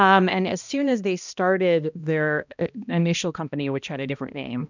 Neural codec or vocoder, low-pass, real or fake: codec, 16 kHz, 1 kbps, X-Codec, HuBERT features, trained on balanced general audio; 7.2 kHz; fake